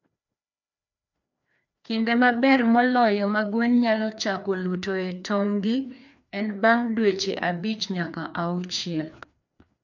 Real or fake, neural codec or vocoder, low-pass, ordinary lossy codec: fake; codec, 16 kHz, 2 kbps, FreqCodec, larger model; 7.2 kHz; none